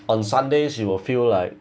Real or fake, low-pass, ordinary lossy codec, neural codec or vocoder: real; none; none; none